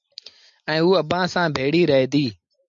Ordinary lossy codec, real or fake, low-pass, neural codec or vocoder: MP3, 64 kbps; real; 7.2 kHz; none